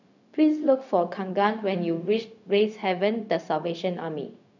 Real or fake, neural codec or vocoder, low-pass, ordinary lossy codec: fake; codec, 16 kHz, 0.4 kbps, LongCat-Audio-Codec; 7.2 kHz; none